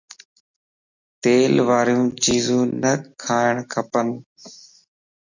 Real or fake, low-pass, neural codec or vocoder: real; 7.2 kHz; none